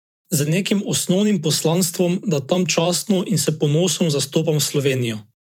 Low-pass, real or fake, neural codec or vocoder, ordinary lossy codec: 19.8 kHz; fake; vocoder, 44.1 kHz, 128 mel bands every 512 samples, BigVGAN v2; MP3, 96 kbps